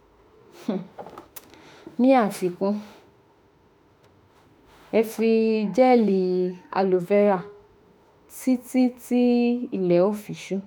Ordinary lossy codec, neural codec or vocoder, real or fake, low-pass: none; autoencoder, 48 kHz, 32 numbers a frame, DAC-VAE, trained on Japanese speech; fake; none